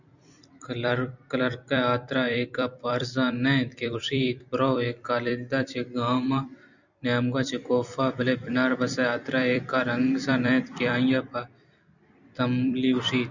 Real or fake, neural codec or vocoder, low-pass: fake; vocoder, 44.1 kHz, 128 mel bands every 256 samples, BigVGAN v2; 7.2 kHz